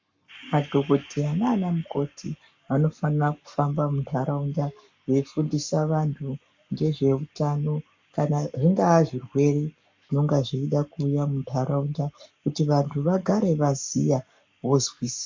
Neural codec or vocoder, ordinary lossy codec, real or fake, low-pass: codec, 44.1 kHz, 7.8 kbps, Pupu-Codec; MP3, 64 kbps; fake; 7.2 kHz